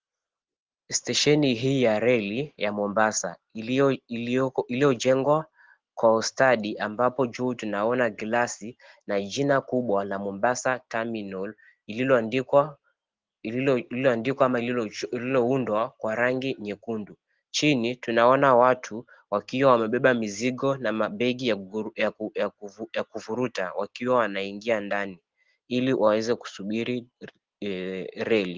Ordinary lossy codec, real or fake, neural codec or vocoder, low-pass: Opus, 16 kbps; real; none; 7.2 kHz